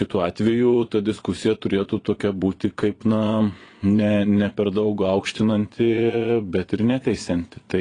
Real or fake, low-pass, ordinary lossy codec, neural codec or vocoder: fake; 9.9 kHz; AAC, 32 kbps; vocoder, 22.05 kHz, 80 mel bands, Vocos